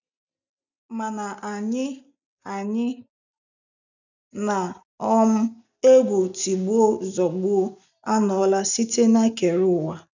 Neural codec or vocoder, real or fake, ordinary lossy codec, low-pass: none; real; none; 7.2 kHz